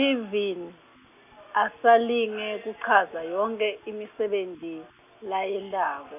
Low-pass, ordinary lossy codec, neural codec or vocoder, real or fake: 3.6 kHz; none; none; real